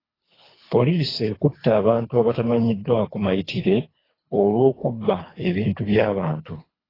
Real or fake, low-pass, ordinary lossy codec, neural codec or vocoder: fake; 5.4 kHz; AAC, 24 kbps; codec, 24 kHz, 3 kbps, HILCodec